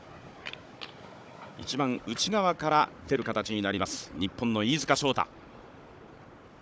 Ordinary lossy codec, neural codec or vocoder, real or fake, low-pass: none; codec, 16 kHz, 16 kbps, FunCodec, trained on Chinese and English, 50 frames a second; fake; none